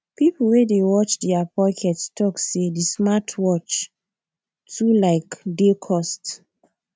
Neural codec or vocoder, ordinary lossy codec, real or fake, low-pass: none; none; real; none